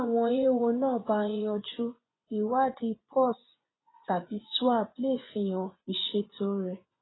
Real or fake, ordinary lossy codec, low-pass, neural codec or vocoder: fake; AAC, 16 kbps; 7.2 kHz; vocoder, 24 kHz, 100 mel bands, Vocos